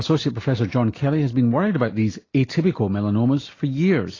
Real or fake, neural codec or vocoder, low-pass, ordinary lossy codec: real; none; 7.2 kHz; AAC, 32 kbps